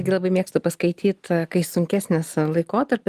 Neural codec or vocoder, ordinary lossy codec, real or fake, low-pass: none; Opus, 32 kbps; real; 14.4 kHz